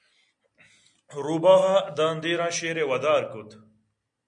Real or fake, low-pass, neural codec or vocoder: real; 9.9 kHz; none